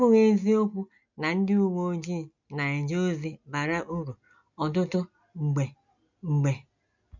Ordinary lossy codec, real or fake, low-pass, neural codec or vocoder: none; real; 7.2 kHz; none